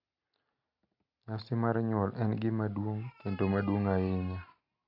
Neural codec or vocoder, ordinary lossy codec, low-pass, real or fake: none; none; 5.4 kHz; real